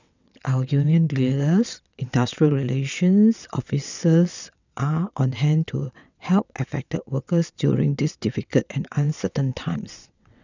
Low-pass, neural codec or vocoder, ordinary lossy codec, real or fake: 7.2 kHz; vocoder, 22.05 kHz, 80 mel bands, WaveNeXt; none; fake